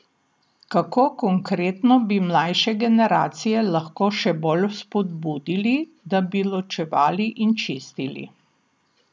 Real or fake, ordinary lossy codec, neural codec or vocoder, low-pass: real; none; none; none